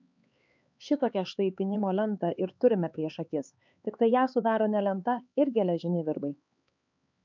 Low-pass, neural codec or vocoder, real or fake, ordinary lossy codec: 7.2 kHz; codec, 16 kHz, 4 kbps, X-Codec, HuBERT features, trained on LibriSpeech; fake; MP3, 64 kbps